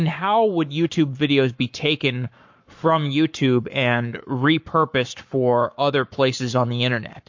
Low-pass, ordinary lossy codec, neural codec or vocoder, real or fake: 7.2 kHz; MP3, 48 kbps; codec, 44.1 kHz, 7.8 kbps, Pupu-Codec; fake